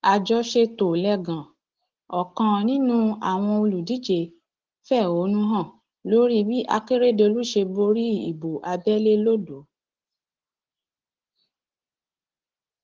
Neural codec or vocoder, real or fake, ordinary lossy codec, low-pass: none; real; Opus, 16 kbps; 7.2 kHz